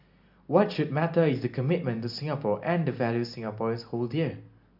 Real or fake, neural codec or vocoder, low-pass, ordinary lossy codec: real; none; 5.4 kHz; none